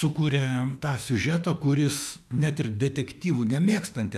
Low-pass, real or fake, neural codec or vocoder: 14.4 kHz; fake; autoencoder, 48 kHz, 32 numbers a frame, DAC-VAE, trained on Japanese speech